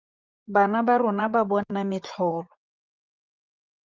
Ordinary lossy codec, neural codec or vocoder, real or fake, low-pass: Opus, 32 kbps; vocoder, 24 kHz, 100 mel bands, Vocos; fake; 7.2 kHz